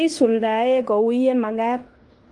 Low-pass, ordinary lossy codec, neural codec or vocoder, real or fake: 10.8 kHz; Opus, 32 kbps; codec, 16 kHz in and 24 kHz out, 0.9 kbps, LongCat-Audio-Codec, fine tuned four codebook decoder; fake